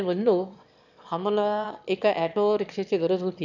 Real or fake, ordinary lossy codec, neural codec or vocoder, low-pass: fake; none; autoencoder, 22.05 kHz, a latent of 192 numbers a frame, VITS, trained on one speaker; 7.2 kHz